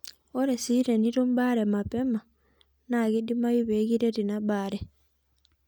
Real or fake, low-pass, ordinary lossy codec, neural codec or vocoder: real; none; none; none